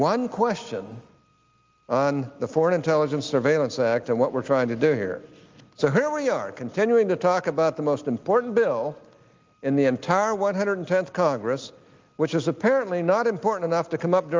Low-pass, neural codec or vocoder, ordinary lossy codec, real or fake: 7.2 kHz; none; Opus, 32 kbps; real